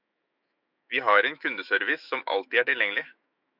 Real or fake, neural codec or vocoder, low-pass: fake; autoencoder, 48 kHz, 128 numbers a frame, DAC-VAE, trained on Japanese speech; 5.4 kHz